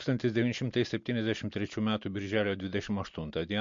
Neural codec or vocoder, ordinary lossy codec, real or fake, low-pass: none; MP3, 48 kbps; real; 7.2 kHz